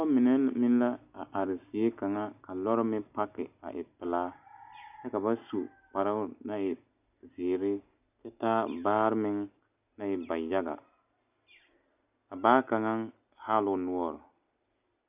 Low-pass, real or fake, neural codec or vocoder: 3.6 kHz; real; none